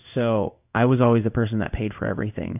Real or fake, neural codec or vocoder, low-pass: fake; codec, 16 kHz in and 24 kHz out, 1 kbps, XY-Tokenizer; 3.6 kHz